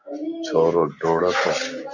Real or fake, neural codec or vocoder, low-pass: real; none; 7.2 kHz